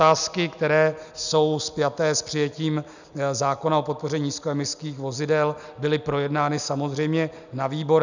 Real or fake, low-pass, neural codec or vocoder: real; 7.2 kHz; none